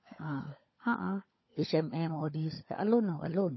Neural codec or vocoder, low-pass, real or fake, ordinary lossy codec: codec, 16 kHz, 16 kbps, FunCodec, trained on LibriTTS, 50 frames a second; 7.2 kHz; fake; MP3, 24 kbps